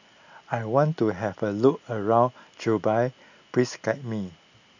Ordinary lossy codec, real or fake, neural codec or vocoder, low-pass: none; real; none; 7.2 kHz